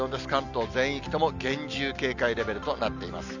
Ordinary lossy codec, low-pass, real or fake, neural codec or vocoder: none; 7.2 kHz; real; none